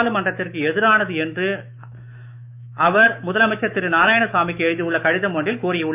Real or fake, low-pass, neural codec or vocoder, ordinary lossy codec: fake; 3.6 kHz; autoencoder, 48 kHz, 128 numbers a frame, DAC-VAE, trained on Japanese speech; none